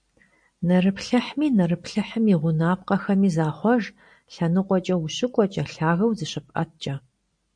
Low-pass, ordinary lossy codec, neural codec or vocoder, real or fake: 9.9 kHz; MP3, 64 kbps; none; real